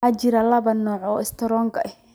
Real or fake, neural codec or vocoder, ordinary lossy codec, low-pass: real; none; none; none